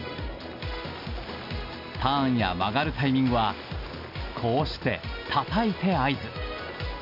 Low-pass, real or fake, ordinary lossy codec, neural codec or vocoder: 5.4 kHz; real; MP3, 48 kbps; none